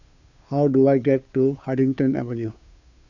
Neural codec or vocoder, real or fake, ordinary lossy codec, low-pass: codec, 16 kHz, 2 kbps, FunCodec, trained on Chinese and English, 25 frames a second; fake; none; 7.2 kHz